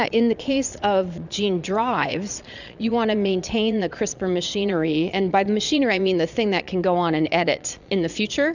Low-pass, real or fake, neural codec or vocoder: 7.2 kHz; fake; vocoder, 22.05 kHz, 80 mel bands, Vocos